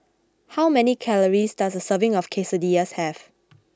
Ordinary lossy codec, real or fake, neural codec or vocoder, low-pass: none; real; none; none